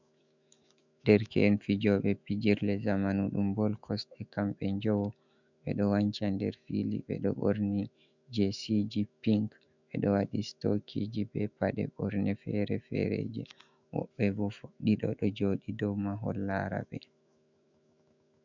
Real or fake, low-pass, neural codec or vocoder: fake; 7.2 kHz; autoencoder, 48 kHz, 128 numbers a frame, DAC-VAE, trained on Japanese speech